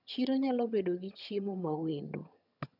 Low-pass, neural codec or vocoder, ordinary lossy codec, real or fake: 5.4 kHz; vocoder, 22.05 kHz, 80 mel bands, HiFi-GAN; none; fake